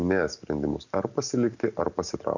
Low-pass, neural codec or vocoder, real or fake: 7.2 kHz; none; real